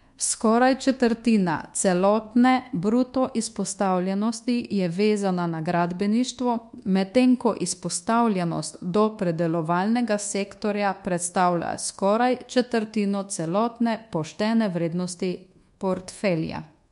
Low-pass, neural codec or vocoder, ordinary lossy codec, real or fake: 10.8 kHz; codec, 24 kHz, 1.2 kbps, DualCodec; MP3, 64 kbps; fake